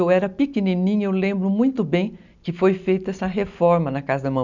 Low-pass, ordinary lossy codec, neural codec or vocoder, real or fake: 7.2 kHz; none; none; real